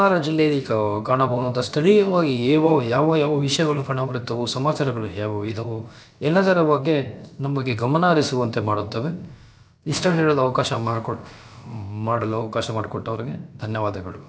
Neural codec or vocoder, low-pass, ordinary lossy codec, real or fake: codec, 16 kHz, about 1 kbps, DyCAST, with the encoder's durations; none; none; fake